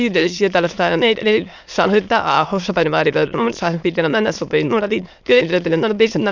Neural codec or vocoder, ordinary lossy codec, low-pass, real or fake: autoencoder, 22.05 kHz, a latent of 192 numbers a frame, VITS, trained on many speakers; none; 7.2 kHz; fake